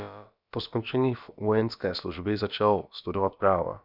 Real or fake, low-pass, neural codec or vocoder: fake; 5.4 kHz; codec, 16 kHz, about 1 kbps, DyCAST, with the encoder's durations